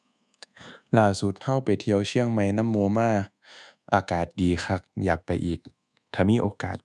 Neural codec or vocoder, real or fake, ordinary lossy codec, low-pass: codec, 24 kHz, 1.2 kbps, DualCodec; fake; none; none